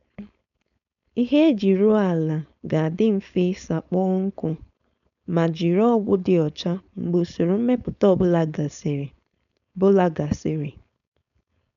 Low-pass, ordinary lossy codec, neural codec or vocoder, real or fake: 7.2 kHz; none; codec, 16 kHz, 4.8 kbps, FACodec; fake